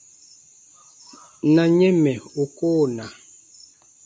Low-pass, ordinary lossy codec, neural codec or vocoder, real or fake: 10.8 kHz; MP3, 48 kbps; none; real